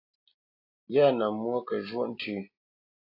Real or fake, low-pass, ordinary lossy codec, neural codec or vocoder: real; 5.4 kHz; AAC, 32 kbps; none